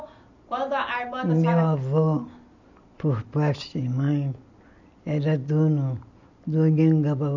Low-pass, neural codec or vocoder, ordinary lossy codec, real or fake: 7.2 kHz; none; none; real